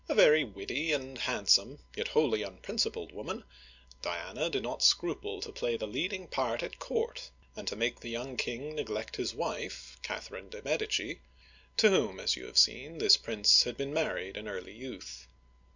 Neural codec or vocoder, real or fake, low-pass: none; real; 7.2 kHz